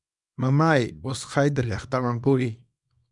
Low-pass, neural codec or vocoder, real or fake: 10.8 kHz; codec, 24 kHz, 0.9 kbps, WavTokenizer, small release; fake